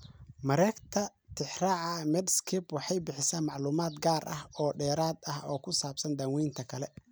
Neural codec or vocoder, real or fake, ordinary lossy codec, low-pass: none; real; none; none